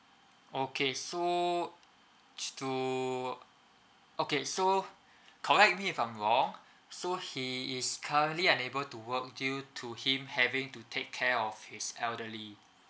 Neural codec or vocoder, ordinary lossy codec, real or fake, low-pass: none; none; real; none